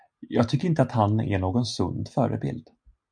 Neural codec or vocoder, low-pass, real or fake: none; 9.9 kHz; real